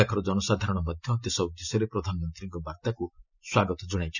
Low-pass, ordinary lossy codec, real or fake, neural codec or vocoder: 7.2 kHz; none; real; none